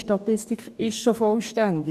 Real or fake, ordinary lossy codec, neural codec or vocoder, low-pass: fake; none; codec, 44.1 kHz, 2.6 kbps, DAC; 14.4 kHz